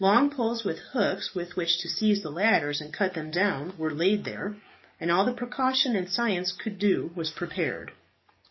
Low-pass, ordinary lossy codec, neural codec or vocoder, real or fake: 7.2 kHz; MP3, 24 kbps; codec, 44.1 kHz, 7.8 kbps, DAC; fake